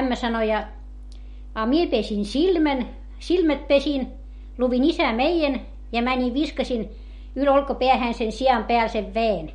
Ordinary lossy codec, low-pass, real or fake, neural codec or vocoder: MP3, 48 kbps; 19.8 kHz; real; none